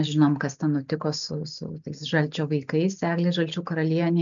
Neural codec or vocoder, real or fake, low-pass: none; real; 7.2 kHz